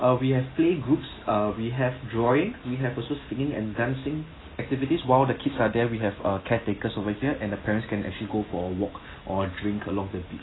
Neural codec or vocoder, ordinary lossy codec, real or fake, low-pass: none; AAC, 16 kbps; real; 7.2 kHz